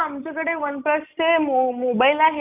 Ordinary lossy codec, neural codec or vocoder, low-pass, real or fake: none; none; 3.6 kHz; real